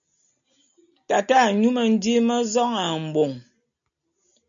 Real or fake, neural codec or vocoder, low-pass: real; none; 7.2 kHz